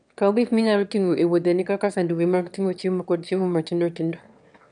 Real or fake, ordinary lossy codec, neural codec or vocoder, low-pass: fake; none; autoencoder, 22.05 kHz, a latent of 192 numbers a frame, VITS, trained on one speaker; 9.9 kHz